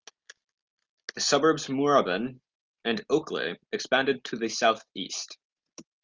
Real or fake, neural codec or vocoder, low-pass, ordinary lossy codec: real; none; 7.2 kHz; Opus, 32 kbps